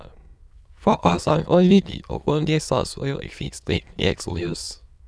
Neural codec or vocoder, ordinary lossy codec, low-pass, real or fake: autoencoder, 22.05 kHz, a latent of 192 numbers a frame, VITS, trained on many speakers; none; none; fake